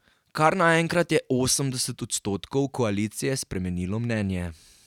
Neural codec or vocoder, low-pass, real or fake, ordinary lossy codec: none; 19.8 kHz; real; none